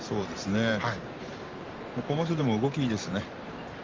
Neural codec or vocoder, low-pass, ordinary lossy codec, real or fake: vocoder, 44.1 kHz, 128 mel bands every 512 samples, BigVGAN v2; 7.2 kHz; Opus, 32 kbps; fake